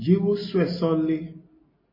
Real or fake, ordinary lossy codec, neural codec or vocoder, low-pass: real; MP3, 24 kbps; none; 5.4 kHz